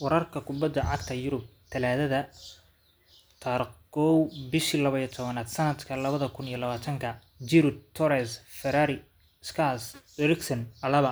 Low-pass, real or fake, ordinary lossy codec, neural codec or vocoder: none; real; none; none